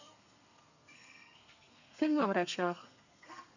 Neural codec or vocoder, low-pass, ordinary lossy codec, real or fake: codec, 32 kHz, 1.9 kbps, SNAC; 7.2 kHz; none; fake